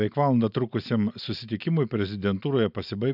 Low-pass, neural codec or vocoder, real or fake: 5.4 kHz; none; real